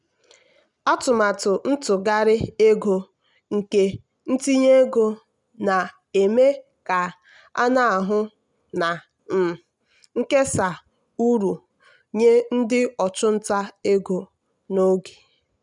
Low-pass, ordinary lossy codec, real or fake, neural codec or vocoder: 10.8 kHz; none; real; none